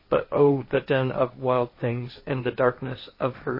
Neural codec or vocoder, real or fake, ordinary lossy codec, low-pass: codec, 16 kHz, 1.1 kbps, Voila-Tokenizer; fake; MP3, 24 kbps; 5.4 kHz